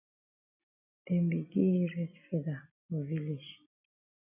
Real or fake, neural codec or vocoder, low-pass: real; none; 3.6 kHz